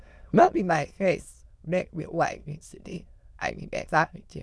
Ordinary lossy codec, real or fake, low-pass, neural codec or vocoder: none; fake; none; autoencoder, 22.05 kHz, a latent of 192 numbers a frame, VITS, trained on many speakers